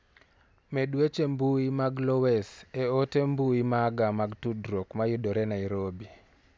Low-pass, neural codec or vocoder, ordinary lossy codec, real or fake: none; none; none; real